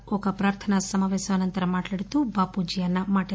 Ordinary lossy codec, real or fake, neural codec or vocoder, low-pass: none; real; none; none